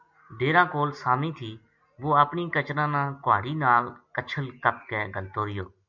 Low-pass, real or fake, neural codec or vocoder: 7.2 kHz; real; none